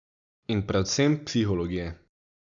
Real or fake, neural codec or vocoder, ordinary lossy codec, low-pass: real; none; MP3, 96 kbps; 7.2 kHz